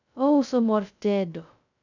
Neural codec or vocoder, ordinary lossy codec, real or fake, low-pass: codec, 16 kHz, 0.2 kbps, FocalCodec; none; fake; 7.2 kHz